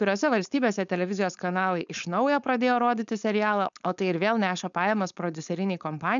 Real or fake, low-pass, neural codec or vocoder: fake; 7.2 kHz; codec, 16 kHz, 4.8 kbps, FACodec